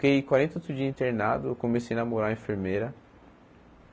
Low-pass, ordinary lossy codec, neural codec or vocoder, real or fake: none; none; none; real